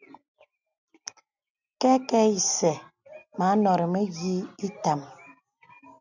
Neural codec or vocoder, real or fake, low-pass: none; real; 7.2 kHz